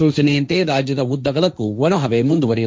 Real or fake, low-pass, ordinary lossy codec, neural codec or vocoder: fake; none; none; codec, 16 kHz, 1.1 kbps, Voila-Tokenizer